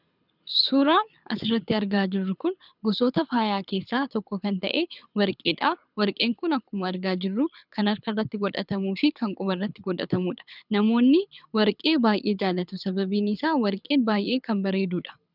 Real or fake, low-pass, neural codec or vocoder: fake; 5.4 kHz; codec, 24 kHz, 6 kbps, HILCodec